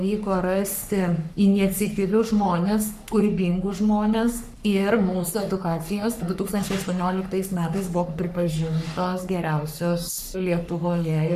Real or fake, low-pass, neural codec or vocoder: fake; 14.4 kHz; codec, 44.1 kHz, 3.4 kbps, Pupu-Codec